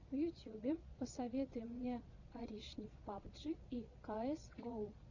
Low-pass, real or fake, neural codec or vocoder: 7.2 kHz; fake; vocoder, 22.05 kHz, 80 mel bands, Vocos